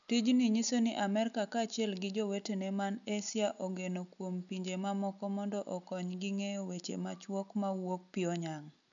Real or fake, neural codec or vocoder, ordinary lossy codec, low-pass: real; none; none; 7.2 kHz